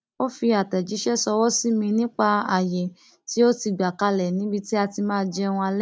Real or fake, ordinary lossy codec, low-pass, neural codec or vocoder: real; none; none; none